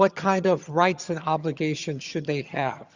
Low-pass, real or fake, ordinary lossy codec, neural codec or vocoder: 7.2 kHz; fake; Opus, 64 kbps; vocoder, 22.05 kHz, 80 mel bands, HiFi-GAN